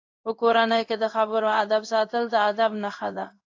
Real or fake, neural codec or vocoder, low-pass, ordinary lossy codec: fake; codec, 16 kHz in and 24 kHz out, 1 kbps, XY-Tokenizer; 7.2 kHz; AAC, 48 kbps